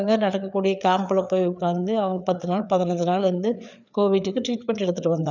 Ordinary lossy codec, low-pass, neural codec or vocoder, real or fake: none; 7.2 kHz; codec, 16 kHz, 4 kbps, FreqCodec, larger model; fake